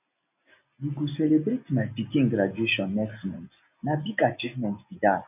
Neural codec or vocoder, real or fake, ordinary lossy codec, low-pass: none; real; none; 3.6 kHz